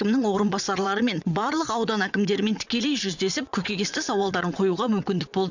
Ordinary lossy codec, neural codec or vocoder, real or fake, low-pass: none; vocoder, 22.05 kHz, 80 mel bands, WaveNeXt; fake; 7.2 kHz